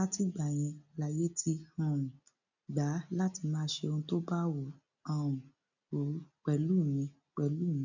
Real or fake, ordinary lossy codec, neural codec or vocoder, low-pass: real; none; none; 7.2 kHz